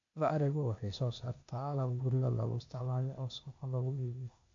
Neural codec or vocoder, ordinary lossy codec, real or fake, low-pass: codec, 16 kHz, 0.8 kbps, ZipCodec; AAC, 48 kbps; fake; 7.2 kHz